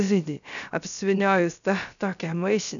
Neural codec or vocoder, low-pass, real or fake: codec, 16 kHz, 0.3 kbps, FocalCodec; 7.2 kHz; fake